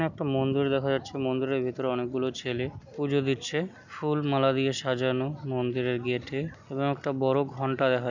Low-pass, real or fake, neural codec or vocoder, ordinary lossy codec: 7.2 kHz; real; none; none